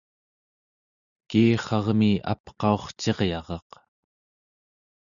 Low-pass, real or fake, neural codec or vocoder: 7.2 kHz; real; none